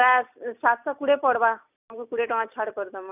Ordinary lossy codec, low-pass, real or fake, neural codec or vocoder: none; 3.6 kHz; real; none